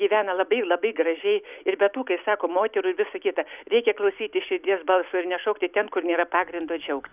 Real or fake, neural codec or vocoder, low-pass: real; none; 3.6 kHz